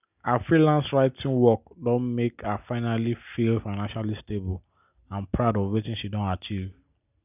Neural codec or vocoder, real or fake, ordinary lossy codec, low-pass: none; real; none; 3.6 kHz